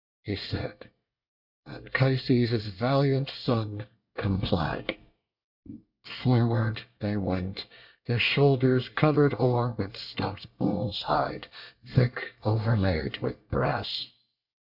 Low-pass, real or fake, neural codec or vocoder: 5.4 kHz; fake; codec, 24 kHz, 1 kbps, SNAC